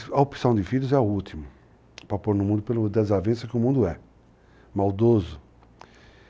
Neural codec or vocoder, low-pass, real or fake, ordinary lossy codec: none; none; real; none